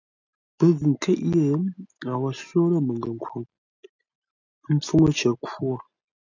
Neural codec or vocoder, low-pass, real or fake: none; 7.2 kHz; real